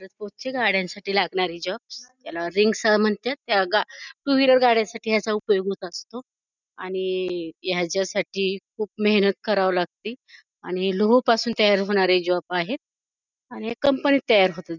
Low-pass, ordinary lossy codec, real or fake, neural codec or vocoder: 7.2 kHz; none; real; none